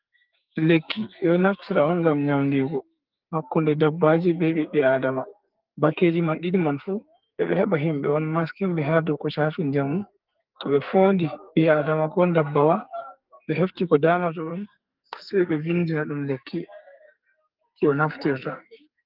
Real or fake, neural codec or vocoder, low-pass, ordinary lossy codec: fake; codec, 44.1 kHz, 2.6 kbps, SNAC; 5.4 kHz; Opus, 32 kbps